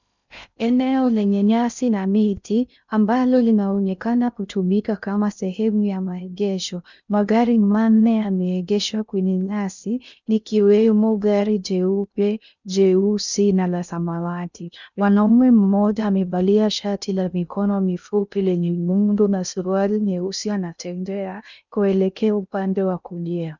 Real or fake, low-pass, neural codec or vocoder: fake; 7.2 kHz; codec, 16 kHz in and 24 kHz out, 0.6 kbps, FocalCodec, streaming, 2048 codes